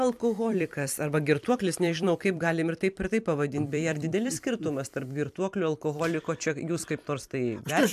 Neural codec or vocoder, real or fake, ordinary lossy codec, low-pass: vocoder, 44.1 kHz, 128 mel bands every 256 samples, BigVGAN v2; fake; AAC, 96 kbps; 14.4 kHz